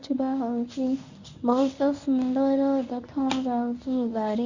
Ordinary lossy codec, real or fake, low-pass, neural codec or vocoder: Opus, 64 kbps; fake; 7.2 kHz; codec, 24 kHz, 0.9 kbps, WavTokenizer, medium speech release version 1